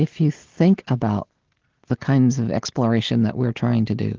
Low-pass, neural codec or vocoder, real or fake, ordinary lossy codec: 7.2 kHz; none; real; Opus, 16 kbps